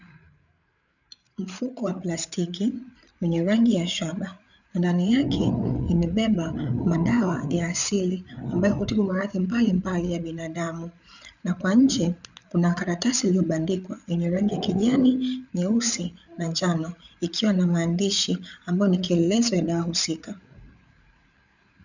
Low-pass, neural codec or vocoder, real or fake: 7.2 kHz; codec, 16 kHz, 8 kbps, FreqCodec, larger model; fake